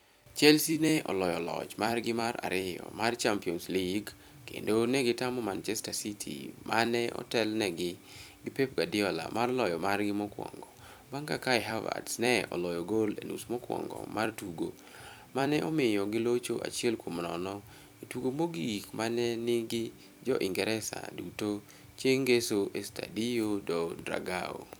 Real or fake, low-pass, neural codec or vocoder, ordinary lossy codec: fake; none; vocoder, 44.1 kHz, 128 mel bands every 256 samples, BigVGAN v2; none